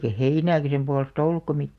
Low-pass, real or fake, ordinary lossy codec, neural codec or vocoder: 14.4 kHz; real; none; none